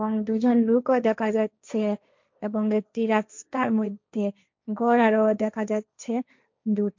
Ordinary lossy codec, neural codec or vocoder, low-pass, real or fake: MP3, 64 kbps; codec, 16 kHz, 1.1 kbps, Voila-Tokenizer; 7.2 kHz; fake